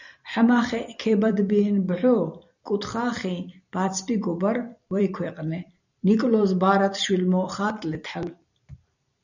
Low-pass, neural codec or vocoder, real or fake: 7.2 kHz; vocoder, 44.1 kHz, 128 mel bands every 256 samples, BigVGAN v2; fake